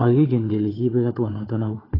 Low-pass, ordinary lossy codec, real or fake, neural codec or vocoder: 5.4 kHz; AAC, 24 kbps; fake; codec, 16 kHz, 4 kbps, FunCodec, trained on Chinese and English, 50 frames a second